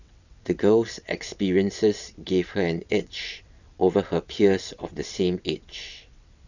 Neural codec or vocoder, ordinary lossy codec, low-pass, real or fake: vocoder, 22.05 kHz, 80 mel bands, WaveNeXt; none; 7.2 kHz; fake